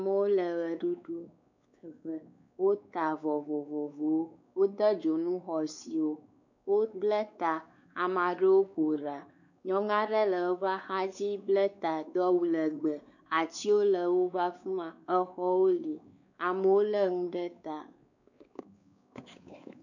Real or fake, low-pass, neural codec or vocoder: fake; 7.2 kHz; codec, 16 kHz, 4 kbps, X-Codec, WavLM features, trained on Multilingual LibriSpeech